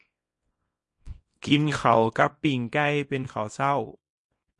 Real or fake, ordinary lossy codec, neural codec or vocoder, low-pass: fake; MP3, 48 kbps; codec, 24 kHz, 0.9 kbps, WavTokenizer, small release; 10.8 kHz